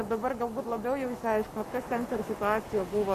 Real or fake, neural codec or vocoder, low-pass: fake; codec, 44.1 kHz, 7.8 kbps, Pupu-Codec; 14.4 kHz